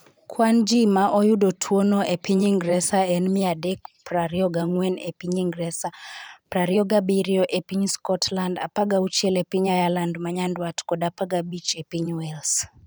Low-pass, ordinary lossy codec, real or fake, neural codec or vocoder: none; none; fake; vocoder, 44.1 kHz, 128 mel bands every 512 samples, BigVGAN v2